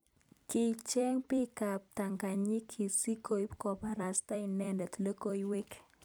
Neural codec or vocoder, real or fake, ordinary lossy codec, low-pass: vocoder, 44.1 kHz, 128 mel bands every 256 samples, BigVGAN v2; fake; none; none